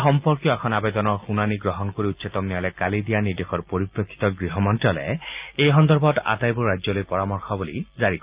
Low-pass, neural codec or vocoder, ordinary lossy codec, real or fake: 3.6 kHz; none; Opus, 24 kbps; real